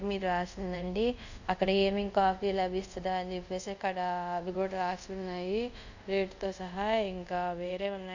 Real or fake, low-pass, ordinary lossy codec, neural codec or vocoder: fake; 7.2 kHz; none; codec, 24 kHz, 0.5 kbps, DualCodec